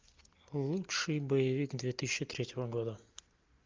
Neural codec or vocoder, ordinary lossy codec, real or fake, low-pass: none; Opus, 24 kbps; real; 7.2 kHz